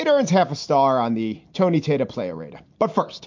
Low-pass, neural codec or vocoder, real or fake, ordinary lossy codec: 7.2 kHz; none; real; MP3, 48 kbps